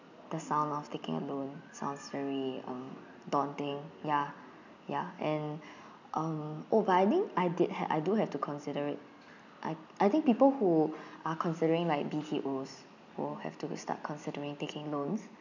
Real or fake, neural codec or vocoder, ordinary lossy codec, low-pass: real; none; none; 7.2 kHz